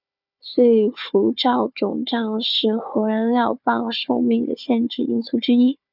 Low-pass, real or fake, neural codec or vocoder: 5.4 kHz; fake; codec, 16 kHz, 4 kbps, FunCodec, trained on Chinese and English, 50 frames a second